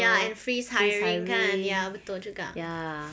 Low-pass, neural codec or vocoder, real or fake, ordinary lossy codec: none; none; real; none